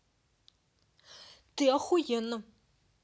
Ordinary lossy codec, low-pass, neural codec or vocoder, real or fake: none; none; none; real